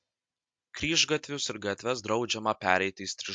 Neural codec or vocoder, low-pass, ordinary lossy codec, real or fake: none; 9.9 kHz; MP3, 64 kbps; real